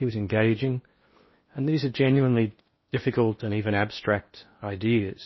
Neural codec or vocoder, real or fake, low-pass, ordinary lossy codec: codec, 16 kHz in and 24 kHz out, 0.8 kbps, FocalCodec, streaming, 65536 codes; fake; 7.2 kHz; MP3, 24 kbps